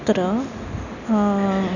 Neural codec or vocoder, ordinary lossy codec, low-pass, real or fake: none; none; 7.2 kHz; real